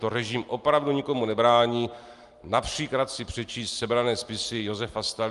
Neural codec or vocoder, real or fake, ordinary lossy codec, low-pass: none; real; Opus, 32 kbps; 10.8 kHz